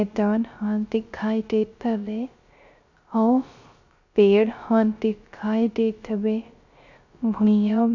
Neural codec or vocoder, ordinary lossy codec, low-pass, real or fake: codec, 16 kHz, 0.3 kbps, FocalCodec; Opus, 64 kbps; 7.2 kHz; fake